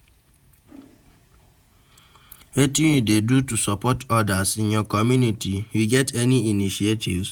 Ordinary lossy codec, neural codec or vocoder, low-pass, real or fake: none; vocoder, 48 kHz, 128 mel bands, Vocos; none; fake